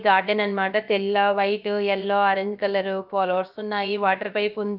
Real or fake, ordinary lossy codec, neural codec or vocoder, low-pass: fake; none; codec, 16 kHz, about 1 kbps, DyCAST, with the encoder's durations; 5.4 kHz